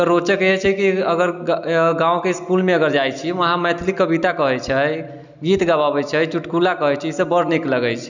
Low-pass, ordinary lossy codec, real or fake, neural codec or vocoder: 7.2 kHz; none; real; none